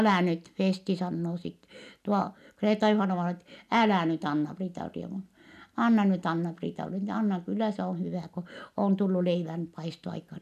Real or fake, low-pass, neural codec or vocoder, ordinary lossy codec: real; 14.4 kHz; none; none